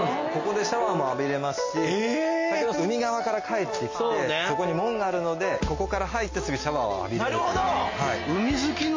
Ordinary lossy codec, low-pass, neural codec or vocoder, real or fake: MP3, 32 kbps; 7.2 kHz; none; real